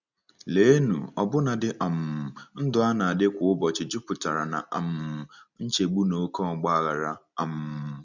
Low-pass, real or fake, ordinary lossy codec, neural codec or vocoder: 7.2 kHz; real; none; none